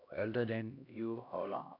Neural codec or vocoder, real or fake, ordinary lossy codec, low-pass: codec, 16 kHz, 1 kbps, X-Codec, HuBERT features, trained on LibriSpeech; fake; none; 5.4 kHz